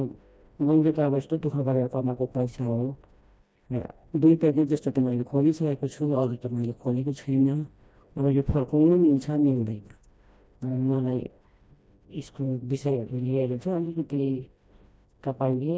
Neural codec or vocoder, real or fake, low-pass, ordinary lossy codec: codec, 16 kHz, 1 kbps, FreqCodec, smaller model; fake; none; none